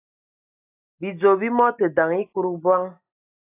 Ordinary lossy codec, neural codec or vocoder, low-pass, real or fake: AAC, 24 kbps; none; 3.6 kHz; real